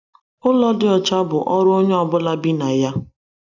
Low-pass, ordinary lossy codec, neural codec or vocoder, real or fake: 7.2 kHz; none; none; real